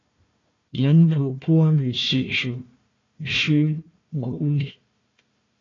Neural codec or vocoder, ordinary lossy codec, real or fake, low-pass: codec, 16 kHz, 1 kbps, FunCodec, trained on Chinese and English, 50 frames a second; AAC, 32 kbps; fake; 7.2 kHz